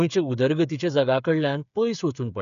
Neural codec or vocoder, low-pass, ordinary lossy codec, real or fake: codec, 16 kHz, 8 kbps, FreqCodec, smaller model; 7.2 kHz; none; fake